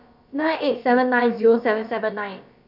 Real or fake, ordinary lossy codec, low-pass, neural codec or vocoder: fake; none; 5.4 kHz; codec, 16 kHz, about 1 kbps, DyCAST, with the encoder's durations